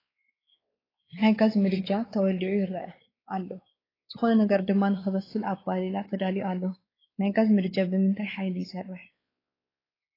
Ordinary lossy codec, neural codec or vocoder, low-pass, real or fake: AAC, 24 kbps; codec, 16 kHz, 4 kbps, X-Codec, WavLM features, trained on Multilingual LibriSpeech; 5.4 kHz; fake